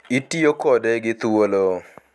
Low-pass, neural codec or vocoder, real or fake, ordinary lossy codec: none; none; real; none